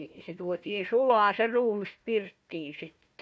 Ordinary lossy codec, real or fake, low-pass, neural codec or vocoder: none; fake; none; codec, 16 kHz, 1 kbps, FunCodec, trained on Chinese and English, 50 frames a second